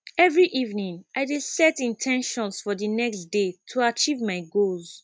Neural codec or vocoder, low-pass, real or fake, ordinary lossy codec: none; none; real; none